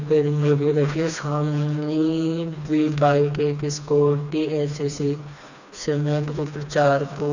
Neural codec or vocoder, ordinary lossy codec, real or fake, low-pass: codec, 16 kHz, 2 kbps, FreqCodec, smaller model; none; fake; 7.2 kHz